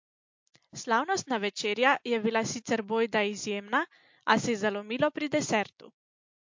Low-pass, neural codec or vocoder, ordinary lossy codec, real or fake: 7.2 kHz; none; MP3, 48 kbps; real